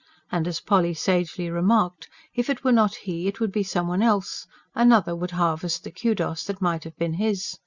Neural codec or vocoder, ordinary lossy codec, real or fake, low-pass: none; Opus, 64 kbps; real; 7.2 kHz